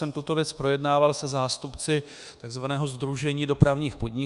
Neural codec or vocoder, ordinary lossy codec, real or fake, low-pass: codec, 24 kHz, 1.2 kbps, DualCodec; Opus, 64 kbps; fake; 10.8 kHz